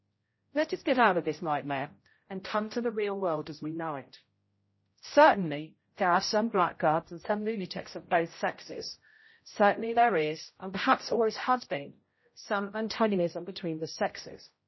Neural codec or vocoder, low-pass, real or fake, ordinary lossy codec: codec, 16 kHz, 0.5 kbps, X-Codec, HuBERT features, trained on general audio; 7.2 kHz; fake; MP3, 24 kbps